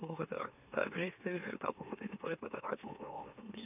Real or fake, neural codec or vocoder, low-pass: fake; autoencoder, 44.1 kHz, a latent of 192 numbers a frame, MeloTTS; 3.6 kHz